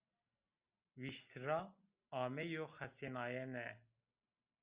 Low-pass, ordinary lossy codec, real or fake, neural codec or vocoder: 3.6 kHz; Opus, 64 kbps; real; none